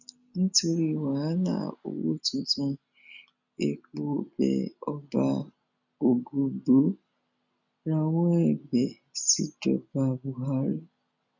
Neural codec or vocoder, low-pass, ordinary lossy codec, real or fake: none; 7.2 kHz; none; real